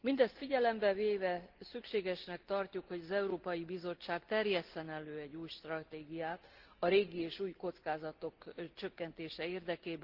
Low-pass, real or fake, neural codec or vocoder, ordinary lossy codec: 5.4 kHz; real; none; Opus, 16 kbps